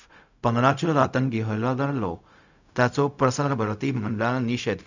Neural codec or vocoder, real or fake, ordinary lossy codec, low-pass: codec, 16 kHz, 0.4 kbps, LongCat-Audio-Codec; fake; none; 7.2 kHz